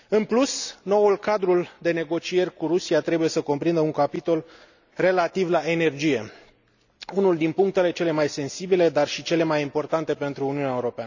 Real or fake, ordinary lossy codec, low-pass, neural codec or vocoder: real; none; 7.2 kHz; none